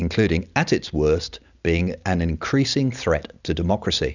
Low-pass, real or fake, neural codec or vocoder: 7.2 kHz; real; none